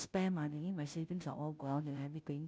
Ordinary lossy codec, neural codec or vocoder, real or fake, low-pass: none; codec, 16 kHz, 0.5 kbps, FunCodec, trained on Chinese and English, 25 frames a second; fake; none